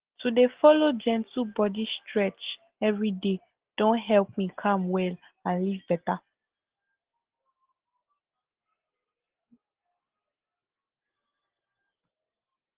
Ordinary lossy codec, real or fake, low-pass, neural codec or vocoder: Opus, 16 kbps; real; 3.6 kHz; none